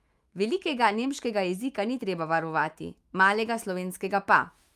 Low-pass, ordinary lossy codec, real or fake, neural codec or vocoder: 14.4 kHz; Opus, 32 kbps; fake; autoencoder, 48 kHz, 128 numbers a frame, DAC-VAE, trained on Japanese speech